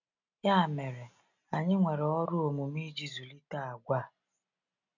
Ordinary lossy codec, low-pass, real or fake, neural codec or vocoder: none; 7.2 kHz; real; none